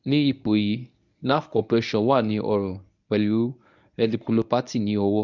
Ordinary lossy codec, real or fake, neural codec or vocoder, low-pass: none; fake; codec, 24 kHz, 0.9 kbps, WavTokenizer, medium speech release version 1; 7.2 kHz